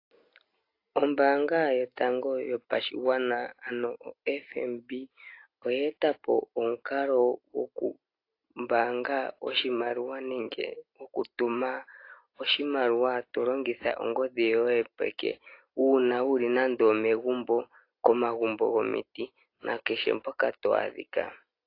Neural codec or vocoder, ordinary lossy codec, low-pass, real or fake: none; AAC, 32 kbps; 5.4 kHz; real